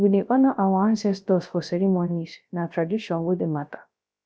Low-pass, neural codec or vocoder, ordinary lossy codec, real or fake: none; codec, 16 kHz, 0.3 kbps, FocalCodec; none; fake